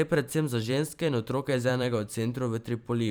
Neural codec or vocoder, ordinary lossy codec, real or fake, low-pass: vocoder, 44.1 kHz, 128 mel bands every 512 samples, BigVGAN v2; none; fake; none